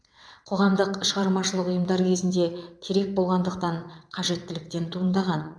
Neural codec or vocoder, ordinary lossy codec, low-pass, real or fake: vocoder, 22.05 kHz, 80 mel bands, WaveNeXt; none; none; fake